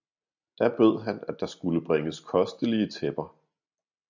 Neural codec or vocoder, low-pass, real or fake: none; 7.2 kHz; real